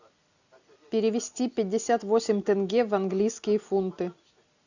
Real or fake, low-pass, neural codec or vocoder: real; 7.2 kHz; none